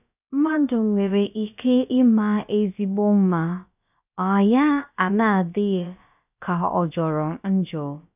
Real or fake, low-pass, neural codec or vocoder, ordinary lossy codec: fake; 3.6 kHz; codec, 16 kHz, about 1 kbps, DyCAST, with the encoder's durations; none